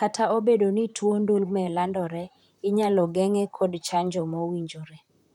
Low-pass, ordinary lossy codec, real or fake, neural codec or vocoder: 19.8 kHz; none; fake; vocoder, 44.1 kHz, 128 mel bands, Pupu-Vocoder